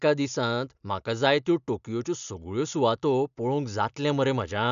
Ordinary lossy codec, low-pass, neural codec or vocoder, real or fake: none; 7.2 kHz; none; real